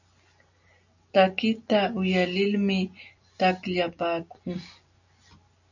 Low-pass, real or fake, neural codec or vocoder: 7.2 kHz; real; none